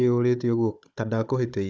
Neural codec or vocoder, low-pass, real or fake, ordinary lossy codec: codec, 16 kHz, 16 kbps, FunCodec, trained on Chinese and English, 50 frames a second; none; fake; none